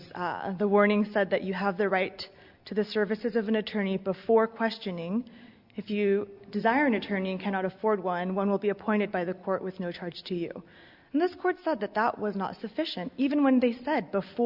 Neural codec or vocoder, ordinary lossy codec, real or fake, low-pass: none; Opus, 64 kbps; real; 5.4 kHz